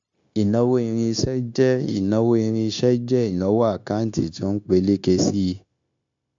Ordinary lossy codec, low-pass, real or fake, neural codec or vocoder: AAC, 64 kbps; 7.2 kHz; fake; codec, 16 kHz, 0.9 kbps, LongCat-Audio-Codec